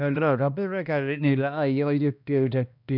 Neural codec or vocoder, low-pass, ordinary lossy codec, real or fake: codec, 16 kHz, 1 kbps, X-Codec, HuBERT features, trained on balanced general audio; 5.4 kHz; none; fake